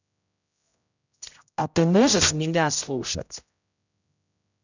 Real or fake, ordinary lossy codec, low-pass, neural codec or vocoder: fake; none; 7.2 kHz; codec, 16 kHz, 0.5 kbps, X-Codec, HuBERT features, trained on general audio